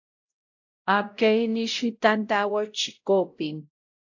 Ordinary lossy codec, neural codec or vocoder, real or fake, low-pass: AAC, 48 kbps; codec, 16 kHz, 0.5 kbps, X-Codec, WavLM features, trained on Multilingual LibriSpeech; fake; 7.2 kHz